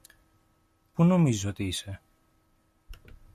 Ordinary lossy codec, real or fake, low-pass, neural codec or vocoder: MP3, 64 kbps; real; 14.4 kHz; none